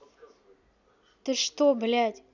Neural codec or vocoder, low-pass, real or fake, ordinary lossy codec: none; 7.2 kHz; real; none